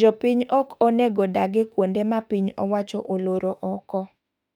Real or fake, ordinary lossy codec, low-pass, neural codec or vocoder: fake; none; 19.8 kHz; autoencoder, 48 kHz, 32 numbers a frame, DAC-VAE, trained on Japanese speech